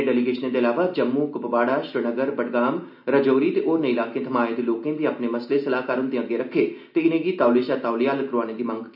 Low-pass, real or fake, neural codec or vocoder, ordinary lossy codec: 5.4 kHz; real; none; none